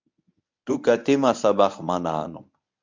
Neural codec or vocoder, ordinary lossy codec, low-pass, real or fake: codec, 24 kHz, 0.9 kbps, WavTokenizer, medium speech release version 1; MP3, 64 kbps; 7.2 kHz; fake